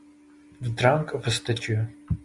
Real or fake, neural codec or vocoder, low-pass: real; none; 10.8 kHz